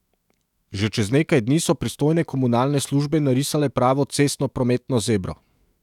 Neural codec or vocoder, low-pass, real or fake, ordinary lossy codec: autoencoder, 48 kHz, 128 numbers a frame, DAC-VAE, trained on Japanese speech; 19.8 kHz; fake; none